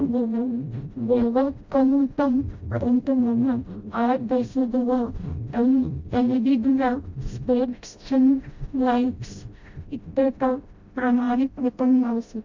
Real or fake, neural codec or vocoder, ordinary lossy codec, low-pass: fake; codec, 16 kHz, 0.5 kbps, FreqCodec, smaller model; MP3, 48 kbps; 7.2 kHz